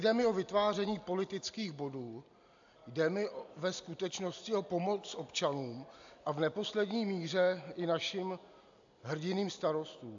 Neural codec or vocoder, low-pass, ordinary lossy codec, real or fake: none; 7.2 kHz; AAC, 64 kbps; real